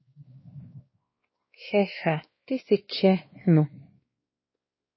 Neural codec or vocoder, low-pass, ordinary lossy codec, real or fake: codec, 16 kHz, 2 kbps, X-Codec, WavLM features, trained on Multilingual LibriSpeech; 7.2 kHz; MP3, 24 kbps; fake